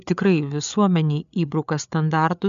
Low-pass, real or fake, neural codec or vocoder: 7.2 kHz; fake; codec, 16 kHz, 8 kbps, FreqCodec, larger model